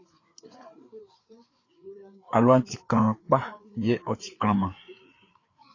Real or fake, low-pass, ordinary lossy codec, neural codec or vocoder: fake; 7.2 kHz; AAC, 32 kbps; codec, 16 kHz, 4 kbps, FreqCodec, larger model